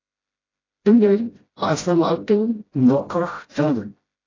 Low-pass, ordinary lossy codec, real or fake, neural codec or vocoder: 7.2 kHz; AAC, 32 kbps; fake; codec, 16 kHz, 0.5 kbps, FreqCodec, smaller model